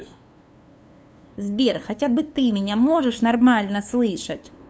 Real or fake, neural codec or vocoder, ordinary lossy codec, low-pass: fake; codec, 16 kHz, 2 kbps, FunCodec, trained on LibriTTS, 25 frames a second; none; none